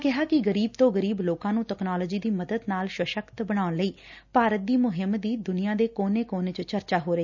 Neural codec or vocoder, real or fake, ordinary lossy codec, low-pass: none; real; none; 7.2 kHz